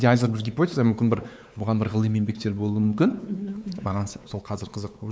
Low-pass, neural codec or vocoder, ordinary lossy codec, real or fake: none; codec, 16 kHz, 4 kbps, X-Codec, WavLM features, trained on Multilingual LibriSpeech; none; fake